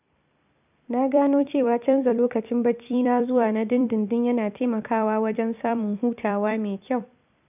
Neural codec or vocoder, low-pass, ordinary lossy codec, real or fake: vocoder, 44.1 kHz, 128 mel bands every 256 samples, BigVGAN v2; 3.6 kHz; none; fake